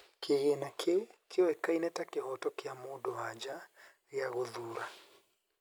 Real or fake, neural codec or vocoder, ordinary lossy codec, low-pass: real; none; none; none